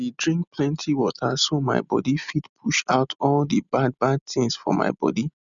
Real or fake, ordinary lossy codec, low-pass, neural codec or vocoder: real; none; 7.2 kHz; none